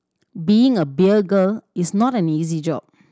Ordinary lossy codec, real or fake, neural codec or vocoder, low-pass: none; real; none; none